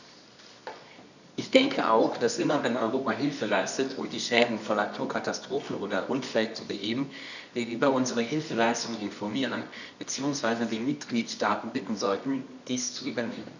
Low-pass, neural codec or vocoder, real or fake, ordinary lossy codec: 7.2 kHz; codec, 24 kHz, 0.9 kbps, WavTokenizer, medium music audio release; fake; none